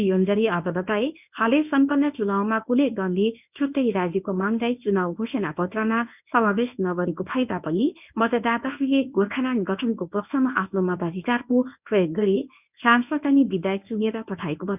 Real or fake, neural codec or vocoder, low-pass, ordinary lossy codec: fake; codec, 24 kHz, 0.9 kbps, WavTokenizer, medium speech release version 1; 3.6 kHz; none